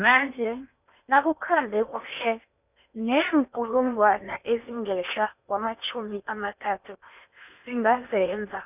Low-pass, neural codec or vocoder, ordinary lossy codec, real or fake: 3.6 kHz; codec, 16 kHz in and 24 kHz out, 0.8 kbps, FocalCodec, streaming, 65536 codes; none; fake